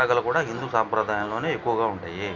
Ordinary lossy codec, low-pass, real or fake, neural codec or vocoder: none; 7.2 kHz; real; none